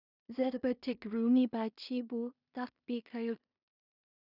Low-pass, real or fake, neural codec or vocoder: 5.4 kHz; fake; codec, 16 kHz in and 24 kHz out, 0.4 kbps, LongCat-Audio-Codec, two codebook decoder